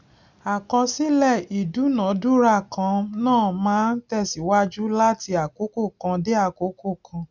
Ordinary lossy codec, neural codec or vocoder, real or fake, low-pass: none; none; real; 7.2 kHz